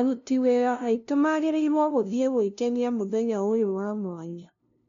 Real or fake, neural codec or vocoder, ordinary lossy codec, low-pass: fake; codec, 16 kHz, 0.5 kbps, FunCodec, trained on LibriTTS, 25 frames a second; MP3, 96 kbps; 7.2 kHz